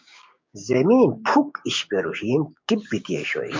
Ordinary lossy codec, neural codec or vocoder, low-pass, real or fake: MP3, 48 kbps; codec, 16 kHz, 6 kbps, DAC; 7.2 kHz; fake